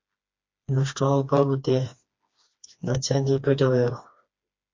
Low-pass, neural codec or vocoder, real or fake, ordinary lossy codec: 7.2 kHz; codec, 16 kHz, 2 kbps, FreqCodec, smaller model; fake; MP3, 48 kbps